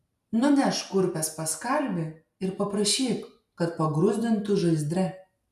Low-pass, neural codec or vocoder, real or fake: 14.4 kHz; none; real